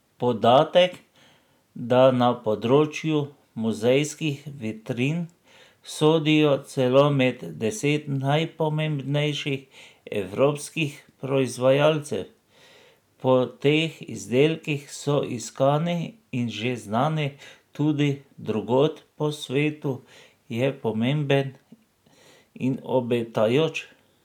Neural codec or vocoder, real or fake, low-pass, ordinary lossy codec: none; real; 19.8 kHz; none